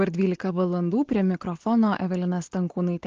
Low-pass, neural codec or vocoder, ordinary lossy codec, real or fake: 7.2 kHz; none; Opus, 16 kbps; real